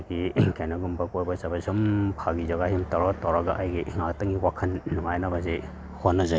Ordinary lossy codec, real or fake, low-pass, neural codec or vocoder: none; real; none; none